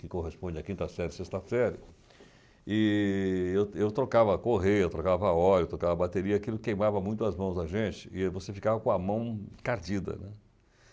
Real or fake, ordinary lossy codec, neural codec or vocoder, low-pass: real; none; none; none